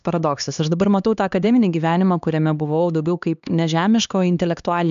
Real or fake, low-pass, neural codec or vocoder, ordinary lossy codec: fake; 7.2 kHz; codec, 16 kHz, 2 kbps, X-Codec, HuBERT features, trained on LibriSpeech; AAC, 96 kbps